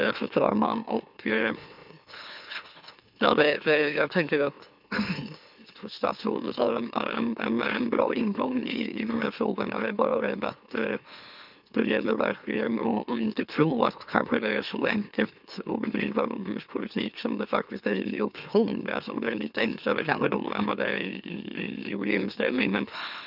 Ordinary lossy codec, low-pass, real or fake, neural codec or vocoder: none; 5.4 kHz; fake; autoencoder, 44.1 kHz, a latent of 192 numbers a frame, MeloTTS